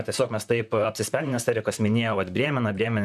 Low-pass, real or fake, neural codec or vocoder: 14.4 kHz; fake; vocoder, 44.1 kHz, 128 mel bands, Pupu-Vocoder